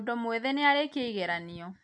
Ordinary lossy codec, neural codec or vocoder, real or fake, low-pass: none; none; real; 9.9 kHz